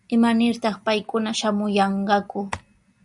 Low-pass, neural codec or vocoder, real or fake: 10.8 kHz; none; real